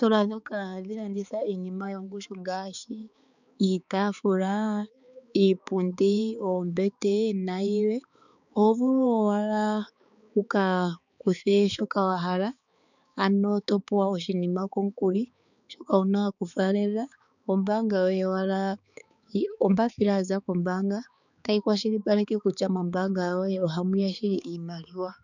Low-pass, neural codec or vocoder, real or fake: 7.2 kHz; codec, 16 kHz, 4 kbps, X-Codec, HuBERT features, trained on balanced general audio; fake